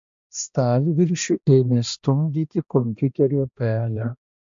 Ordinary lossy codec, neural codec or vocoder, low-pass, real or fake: MP3, 48 kbps; codec, 16 kHz, 1 kbps, X-Codec, HuBERT features, trained on balanced general audio; 7.2 kHz; fake